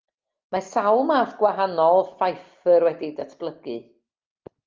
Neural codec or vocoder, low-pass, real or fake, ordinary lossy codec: none; 7.2 kHz; real; Opus, 32 kbps